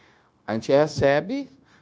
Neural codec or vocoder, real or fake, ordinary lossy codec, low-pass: codec, 16 kHz, 0.9 kbps, LongCat-Audio-Codec; fake; none; none